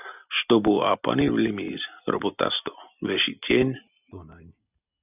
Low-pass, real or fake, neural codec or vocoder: 3.6 kHz; real; none